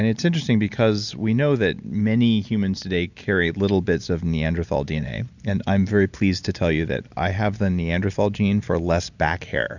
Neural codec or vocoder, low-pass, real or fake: none; 7.2 kHz; real